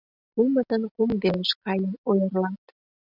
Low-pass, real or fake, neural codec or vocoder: 5.4 kHz; real; none